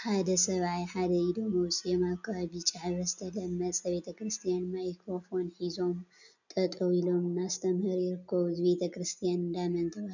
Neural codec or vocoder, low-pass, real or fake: none; 7.2 kHz; real